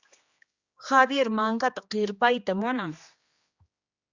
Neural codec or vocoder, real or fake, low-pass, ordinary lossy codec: codec, 16 kHz, 2 kbps, X-Codec, HuBERT features, trained on general audio; fake; 7.2 kHz; Opus, 64 kbps